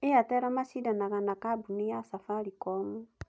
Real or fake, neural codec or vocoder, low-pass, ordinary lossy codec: real; none; none; none